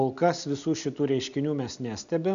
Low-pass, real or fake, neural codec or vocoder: 7.2 kHz; real; none